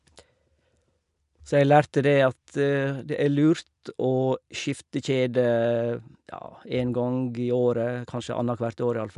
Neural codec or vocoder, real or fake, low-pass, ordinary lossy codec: none; real; 10.8 kHz; none